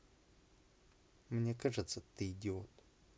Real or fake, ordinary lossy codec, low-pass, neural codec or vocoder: real; none; none; none